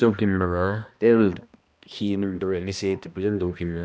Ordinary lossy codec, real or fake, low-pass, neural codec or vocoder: none; fake; none; codec, 16 kHz, 1 kbps, X-Codec, HuBERT features, trained on balanced general audio